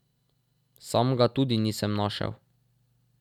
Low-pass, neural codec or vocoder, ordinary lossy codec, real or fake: 19.8 kHz; none; none; real